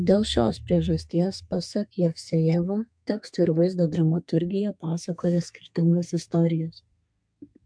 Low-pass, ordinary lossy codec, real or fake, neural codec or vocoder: 9.9 kHz; MP3, 64 kbps; fake; codec, 32 kHz, 1.9 kbps, SNAC